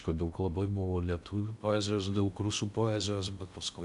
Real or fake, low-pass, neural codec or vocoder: fake; 10.8 kHz; codec, 16 kHz in and 24 kHz out, 0.6 kbps, FocalCodec, streaming, 2048 codes